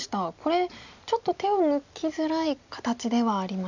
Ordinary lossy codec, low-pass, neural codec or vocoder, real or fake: none; 7.2 kHz; none; real